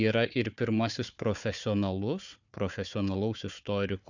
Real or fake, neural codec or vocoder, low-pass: fake; codec, 16 kHz, 4 kbps, FunCodec, trained on Chinese and English, 50 frames a second; 7.2 kHz